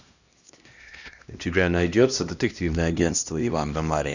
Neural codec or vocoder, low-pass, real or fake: codec, 16 kHz, 1 kbps, X-Codec, HuBERT features, trained on LibriSpeech; 7.2 kHz; fake